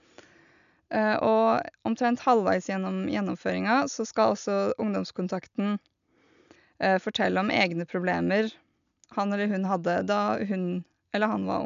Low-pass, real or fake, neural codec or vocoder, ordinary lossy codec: 7.2 kHz; real; none; none